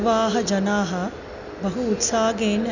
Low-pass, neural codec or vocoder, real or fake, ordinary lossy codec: 7.2 kHz; none; real; none